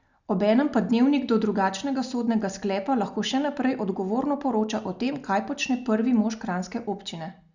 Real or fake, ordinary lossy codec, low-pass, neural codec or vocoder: real; Opus, 64 kbps; 7.2 kHz; none